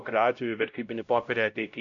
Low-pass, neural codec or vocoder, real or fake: 7.2 kHz; codec, 16 kHz, 0.5 kbps, X-Codec, WavLM features, trained on Multilingual LibriSpeech; fake